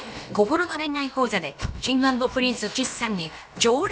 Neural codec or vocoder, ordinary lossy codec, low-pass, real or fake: codec, 16 kHz, about 1 kbps, DyCAST, with the encoder's durations; none; none; fake